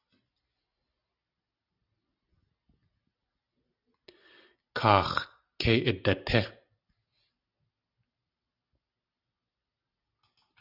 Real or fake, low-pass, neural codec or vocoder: real; 5.4 kHz; none